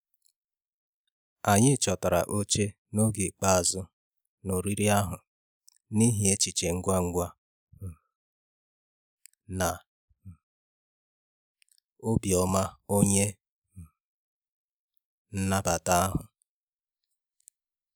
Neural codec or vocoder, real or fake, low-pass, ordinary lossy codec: none; real; none; none